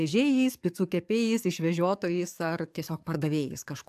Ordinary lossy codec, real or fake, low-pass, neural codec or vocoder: AAC, 96 kbps; fake; 14.4 kHz; codec, 44.1 kHz, 7.8 kbps, DAC